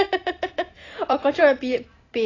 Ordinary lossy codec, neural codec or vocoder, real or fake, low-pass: AAC, 32 kbps; vocoder, 44.1 kHz, 128 mel bands every 512 samples, BigVGAN v2; fake; 7.2 kHz